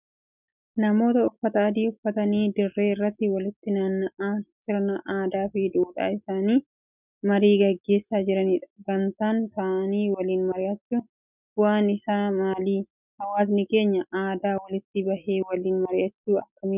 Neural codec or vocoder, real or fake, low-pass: none; real; 3.6 kHz